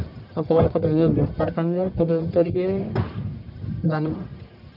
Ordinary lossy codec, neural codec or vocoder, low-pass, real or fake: none; codec, 44.1 kHz, 1.7 kbps, Pupu-Codec; 5.4 kHz; fake